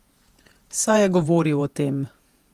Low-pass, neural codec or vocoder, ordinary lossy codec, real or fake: 14.4 kHz; vocoder, 48 kHz, 128 mel bands, Vocos; Opus, 32 kbps; fake